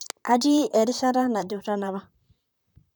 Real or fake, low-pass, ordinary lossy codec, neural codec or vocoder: fake; none; none; vocoder, 44.1 kHz, 128 mel bands, Pupu-Vocoder